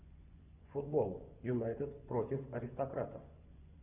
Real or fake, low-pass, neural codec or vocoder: fake; 3.6 kHz; vocoder, 24 kHz, 100 mel bands, Vocos